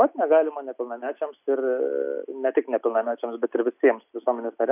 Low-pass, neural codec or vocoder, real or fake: 3.6 kHz; none; real